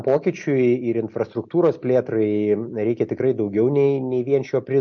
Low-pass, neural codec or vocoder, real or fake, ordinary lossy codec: 7.2 kHz; none; real; MP3, 48 kbps